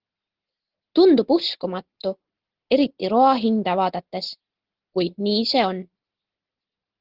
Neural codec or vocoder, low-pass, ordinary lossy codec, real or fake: none; 5.4 kHz; Opus, 16 kbps; real